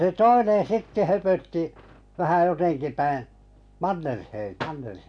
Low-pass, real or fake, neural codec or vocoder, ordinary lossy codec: 9.9 kHz; real; none; none